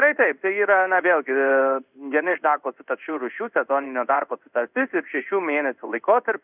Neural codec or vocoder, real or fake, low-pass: codec, 16 kHz in and 24 kHz out, 1 kbps, XY-Tokenizer; fake; 3.6 kHz